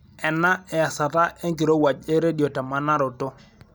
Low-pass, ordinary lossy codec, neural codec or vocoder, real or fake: none; none; none; real